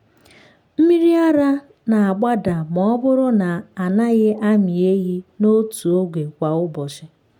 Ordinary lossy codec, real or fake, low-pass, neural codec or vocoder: none; real; 19.8 kHz; none